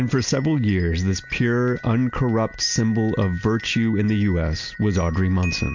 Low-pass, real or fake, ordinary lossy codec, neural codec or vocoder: 7.2 kHz; real; MP3, 48 kbps; none